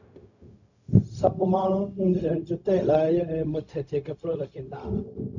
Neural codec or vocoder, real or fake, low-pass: codec, 16 kHz, 0.4 kbps, LongCat-Audio-Codec; fake; 7.2 kHz